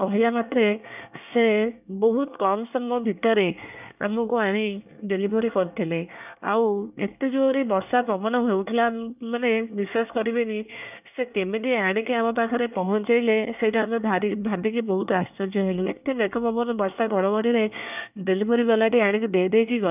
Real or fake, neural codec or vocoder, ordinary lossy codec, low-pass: fake; codec, 24 kHz, 1 kbps, SNAC; none; 3.6 kHz